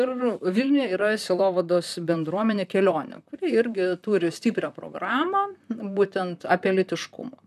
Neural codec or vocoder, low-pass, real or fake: vocoder, 44.1 kHz, 128 mel bands, Pupu-Vocoder; 14.4 kHz; fake